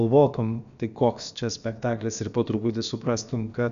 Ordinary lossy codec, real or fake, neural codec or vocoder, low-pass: MP3, 96 kbps; fake; codec, 16 kHz, about 1 kbps, DyCAST, with the encoder's durations; 7.2 kHz